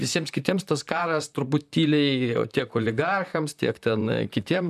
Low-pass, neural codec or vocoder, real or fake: 14.4 kHz; vocoder, 44.1 kHz, 128 mel bands, Pupu-Vocoder; fake